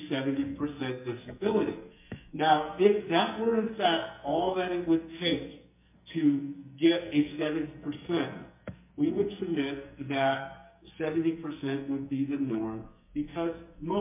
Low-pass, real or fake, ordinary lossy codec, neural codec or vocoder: 3.6 kHz; fake; MP3, 32 kbps; codec, 44.1 kHz, 2.6 kbps, SNAC